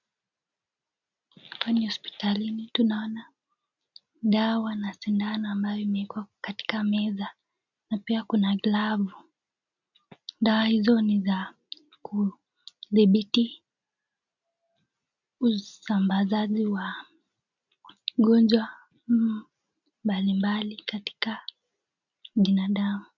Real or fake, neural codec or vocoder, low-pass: real; none; 7.2 kHz